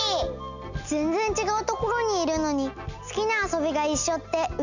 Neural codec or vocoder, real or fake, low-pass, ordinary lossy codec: none; real; 7.2 kHz; none